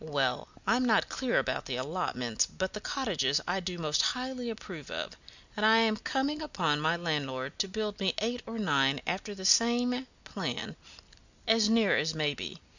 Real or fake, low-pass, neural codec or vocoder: real; 7.2 kHz; none